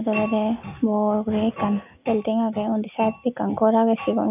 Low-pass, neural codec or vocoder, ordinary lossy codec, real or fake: 3.6 kHz; none; none; real